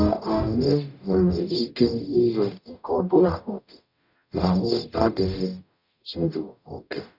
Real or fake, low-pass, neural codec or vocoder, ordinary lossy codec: fake; 5.4 kHz; codec, 44.1 kHz, 0.9 kbps, DAC; none